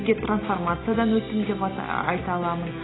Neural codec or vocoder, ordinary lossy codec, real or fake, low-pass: none; AAC, 16 kbps; real; 7.2 kHz